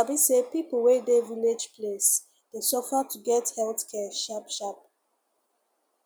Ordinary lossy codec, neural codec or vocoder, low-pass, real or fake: none; none; none; real